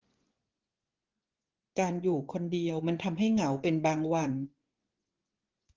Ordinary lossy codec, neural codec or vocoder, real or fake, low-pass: Opus, 16 kbps; vocoder, 24 kHz, 100 mel bands, Vocos; fake; 7.2 kHz